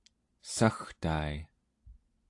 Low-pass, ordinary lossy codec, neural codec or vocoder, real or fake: 10.8 kHz; AAC, 48 kbps; none; real